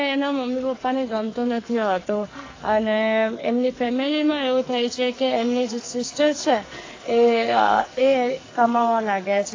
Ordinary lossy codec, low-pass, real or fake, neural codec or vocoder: AAC, 32 kbps; 7.2 kHz; fake; codec, 44.1 kHz, 2.6 kbps, SNAC